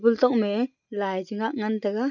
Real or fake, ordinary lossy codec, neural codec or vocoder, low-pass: real; none; none; 7.2 kHz